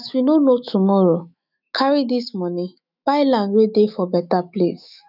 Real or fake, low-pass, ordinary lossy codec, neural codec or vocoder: fake; 5.4 kHz; none; autoencoder, 48 kHz, 128 numbers a frame, DAC-VAE, trained on Japanese speech